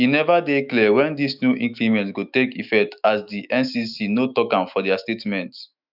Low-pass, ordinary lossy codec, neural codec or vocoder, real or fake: 5.4 kHz; none; autoencoder, 48 kHz, 128 numbers a frame, DAC-VAE, trained on Japanese speech; fake